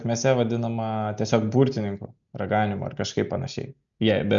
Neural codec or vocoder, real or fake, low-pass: none; real; 7.2 kHz